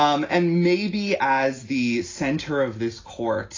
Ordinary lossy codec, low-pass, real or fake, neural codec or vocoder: AAC, 32 kbps; 7.2 kHz; real; none